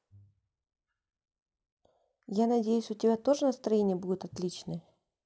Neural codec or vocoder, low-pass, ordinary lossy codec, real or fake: none; none; none; real